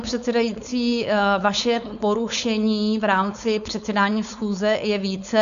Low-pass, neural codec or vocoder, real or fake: 7.2 kHz; codec, 16 kHz, 4.8 kbps, FACodec; fake